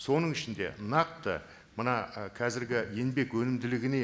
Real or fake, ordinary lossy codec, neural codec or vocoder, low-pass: real; none; none; none